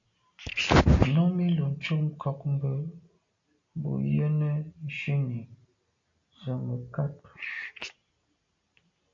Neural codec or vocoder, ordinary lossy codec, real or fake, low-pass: none; AAC, 48 kbps; real; 7.2 kHz